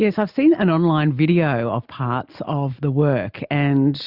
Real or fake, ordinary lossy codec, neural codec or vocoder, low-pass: real; Opus, 64 kbps; none; 5.4 kHz